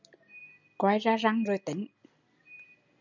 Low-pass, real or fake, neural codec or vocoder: 7.2 kHz; real; none